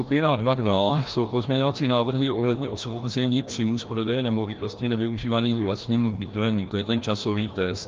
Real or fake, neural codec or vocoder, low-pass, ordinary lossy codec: fake; codec, 16 kHz, 1 kbps, FreqCodec, larger model; 7.2 kHz; Opus, 32 kbps